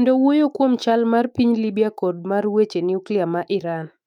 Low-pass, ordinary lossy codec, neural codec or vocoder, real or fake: 19.8 kHz; none; autoencoder, 48 kHz, 128 numbers a frame, DAC-VAE, trained on Japanese speech; fake